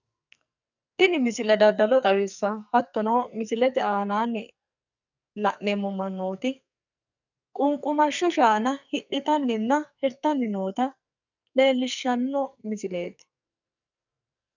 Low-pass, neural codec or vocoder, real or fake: 7.2 kHz; codec, 44.1 kHz, 2.6 kbps, SNAC; fake